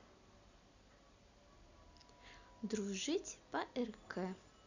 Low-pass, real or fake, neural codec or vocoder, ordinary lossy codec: 7.2 kHz; real; none; none